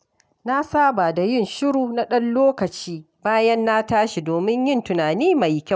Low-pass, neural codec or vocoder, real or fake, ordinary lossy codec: none; none; real; none